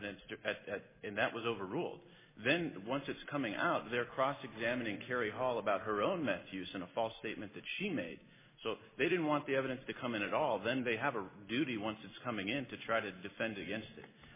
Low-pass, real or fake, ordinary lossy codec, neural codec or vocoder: 3.6 kHz; real; MP3, 16 kbps; none